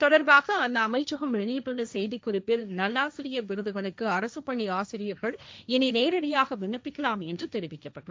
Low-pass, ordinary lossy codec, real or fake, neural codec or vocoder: none; none; fake; codec, 16 kHz, 1.1 kbps, Voila-Tokenizer